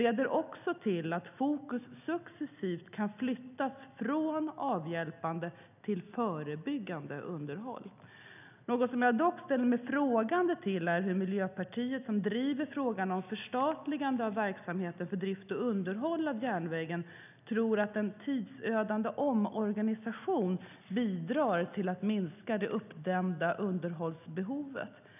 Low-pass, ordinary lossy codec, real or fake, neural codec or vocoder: 3.6 kHz; none; real; none